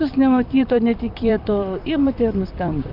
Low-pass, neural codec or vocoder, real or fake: 5.4 kHz; vocoder, 44.1 kHz, 128 mel bands, Pupu-Vocoder; fake